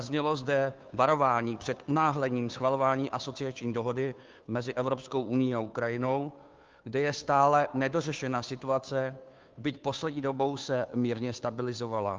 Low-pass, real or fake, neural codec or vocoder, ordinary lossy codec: 7.2 kHz; fake; codec, 16 kHz, 2 kbps, FunCodec, trained on Chinese and English, 25 frames a second; Opus, 24 kbps